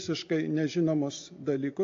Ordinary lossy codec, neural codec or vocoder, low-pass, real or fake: AAC, 48 kbps; none; 7.2 kHz; real